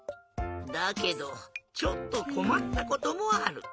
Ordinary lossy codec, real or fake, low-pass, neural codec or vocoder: none; real; none; none